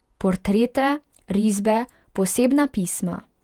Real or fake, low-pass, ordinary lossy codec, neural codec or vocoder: fake; 19.8 kHz; Opus, 32 kbps; vocoder, 48 kHz, 128 mel bands, Vocos